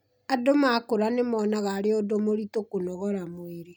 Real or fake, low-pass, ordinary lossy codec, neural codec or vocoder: real; none; none; none